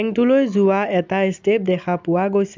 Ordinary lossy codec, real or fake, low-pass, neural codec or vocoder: MP3, 64 kbps; real; 7.2 kHz; none